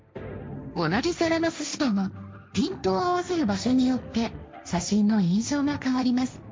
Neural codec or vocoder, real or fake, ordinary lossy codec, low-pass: codec, 16 kHz, 1.1 kbps, Voila-Tokenizer; fake; none; none